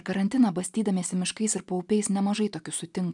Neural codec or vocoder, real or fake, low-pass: none; real; 10.8 kHz